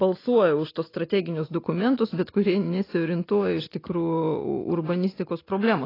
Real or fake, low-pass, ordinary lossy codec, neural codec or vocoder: real; 5.4 kHz; AAC, 24 kbps; none